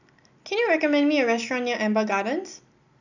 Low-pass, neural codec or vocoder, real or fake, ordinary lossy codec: 7.2 kHz; none; real; none